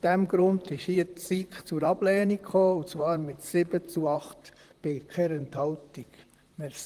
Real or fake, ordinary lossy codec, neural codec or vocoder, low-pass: fake; Opus, 24 kbps; vocoder, 44.1 kHz, 128 mel bands, Pupu-Vocoder; 14.4 kHz